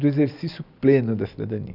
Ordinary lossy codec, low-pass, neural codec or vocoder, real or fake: none; 5.4 kHz; none; real